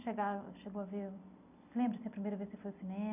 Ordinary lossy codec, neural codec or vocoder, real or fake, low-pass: none; vocoder, 44.1 kHz, 128 mel bands every 256 samples, BigVGAN v2; fake; 3.6 kHz